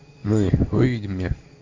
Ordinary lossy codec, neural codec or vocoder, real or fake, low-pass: MP3, 64 kbps; codec, 16 kHz in and 24 kHz out, 1 kbps, XY-Tokenizer; fake; 7.2 kHz